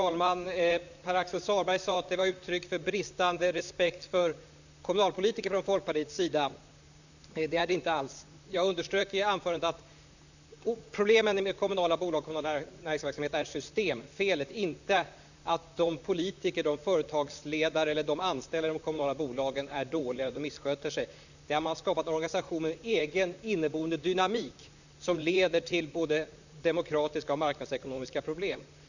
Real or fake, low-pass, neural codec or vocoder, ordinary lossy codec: fake; 7.2 kHz; vocoder, 44.1 kHz, 128 mel bands, Pupu-Vocoder; none